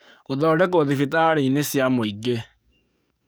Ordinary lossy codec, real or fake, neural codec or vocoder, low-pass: none; fake; codec, 44.1 kHz, 7.8 kbps, DAC; none